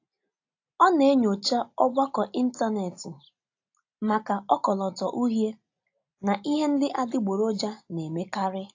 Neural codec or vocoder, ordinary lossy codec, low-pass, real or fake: none; AAC, 48 kbps; 7.2 kHz; real